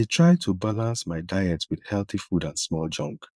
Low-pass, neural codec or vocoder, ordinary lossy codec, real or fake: none; vocoder, 22.05 kHz, 80 mel bands, Vocos; none; fake